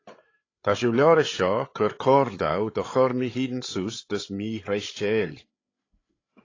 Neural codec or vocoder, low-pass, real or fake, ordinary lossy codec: codec, 16 kHz, 8 kbps, FreqCodec, larger model; 7.2 kHz; fake; AAC, 32 kbps